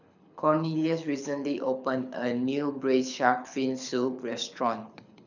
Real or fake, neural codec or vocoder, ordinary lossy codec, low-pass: fake; codec, 24 kHz, 6 kbps, HILCodec; none; 7.2 kHz